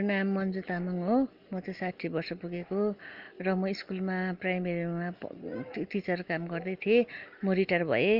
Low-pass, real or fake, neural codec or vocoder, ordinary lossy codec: 5.4 kHz; real; none; Opus, 24 kbps